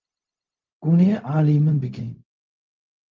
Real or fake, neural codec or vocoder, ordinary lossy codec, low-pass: fake; codec, 16 kHz, 0.4 kbps, LongCat-Audio-Codec; Opus, 24 kbps; 7.2 kHz